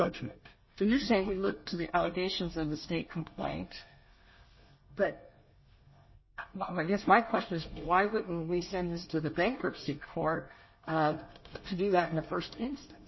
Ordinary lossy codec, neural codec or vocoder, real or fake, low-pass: MP3, 24 kbps; codec, 24 kHz, 1 kbps, SNAC; fake; 7.2 kHz